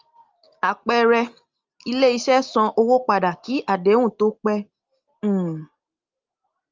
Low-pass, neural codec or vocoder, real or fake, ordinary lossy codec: 7.2 kHz; none; real; Opus, 32 kbps